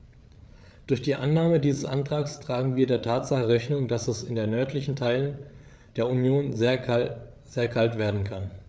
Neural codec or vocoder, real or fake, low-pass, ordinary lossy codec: codec, 16 kHz, 8 kbps, FreqCodec, larger model; fake; none; none